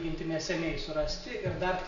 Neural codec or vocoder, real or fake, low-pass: none; real; 7.2 kHz